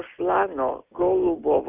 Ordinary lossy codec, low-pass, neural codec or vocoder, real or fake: Opus, 64 kbps; 3.6 kHz; none; real